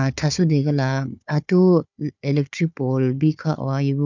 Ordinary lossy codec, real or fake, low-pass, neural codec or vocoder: none; fake; 7.2 kHz; codec, 16 kHz, 4 kbps, FunCodec, trained on Chinese and English, 50 frames a second